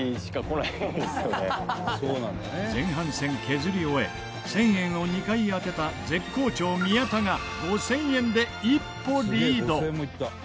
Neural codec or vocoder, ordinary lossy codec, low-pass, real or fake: none; none; none; real